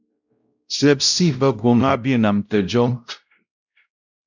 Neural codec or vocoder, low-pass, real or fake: codec, 16 kHz, 0.5 kbps, X-Codec, WavLM features, trained on Multilingual LibriSpeech; 7.2 kHz; fake